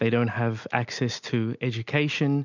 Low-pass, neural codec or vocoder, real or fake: 7.2 kHz; none; real